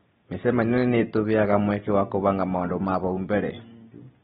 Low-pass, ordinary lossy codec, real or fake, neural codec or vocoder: 7.2 kHz; AAC, 16 kbps; real; none